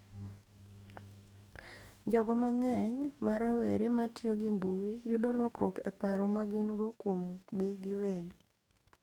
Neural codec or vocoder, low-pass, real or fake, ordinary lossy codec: codec, 44.1 kHz, 2.6 kbps, DAC; 19.8 kHz; fake; none